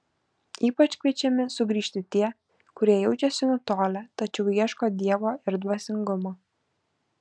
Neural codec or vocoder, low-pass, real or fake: none; 9.9 kHz; real